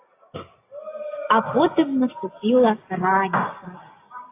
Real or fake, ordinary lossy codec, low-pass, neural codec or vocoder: real; AAC, 24 kbps; 3.6 kHz; none